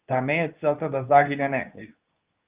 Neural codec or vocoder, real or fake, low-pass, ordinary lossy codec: codec, 24 kHz, 0.9 kbps, WavTokenizer, medium speech release version 2; fake; 3.6 kHz; Opus, 24 kbps